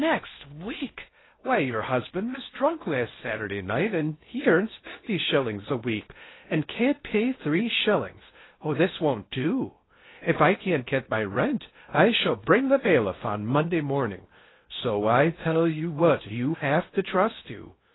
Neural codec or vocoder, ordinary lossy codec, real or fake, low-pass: codec, 16 kHz in and 24 kHz out, 0.6 kbps, FocalCodec, streaming, 4096 codes; AAC, 16 kbps; fake; 7.2 kHz